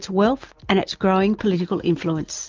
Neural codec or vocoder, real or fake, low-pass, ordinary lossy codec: none; real; 7.2 kHz; Opus, 32 kbps